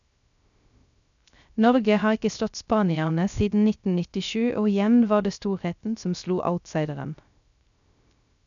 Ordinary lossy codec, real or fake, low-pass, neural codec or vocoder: none; fake; 7.2 kHz; codec, 16 kHz, 0.3 kbps, FocalCodec